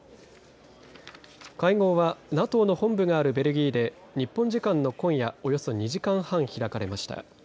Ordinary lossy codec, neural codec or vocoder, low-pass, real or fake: none; none; none; real